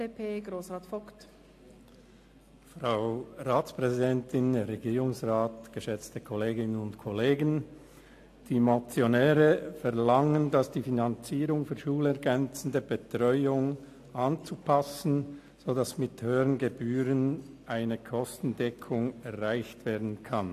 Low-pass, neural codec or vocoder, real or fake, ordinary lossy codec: 14.4 kHz; none; real; AAC, 64 kbps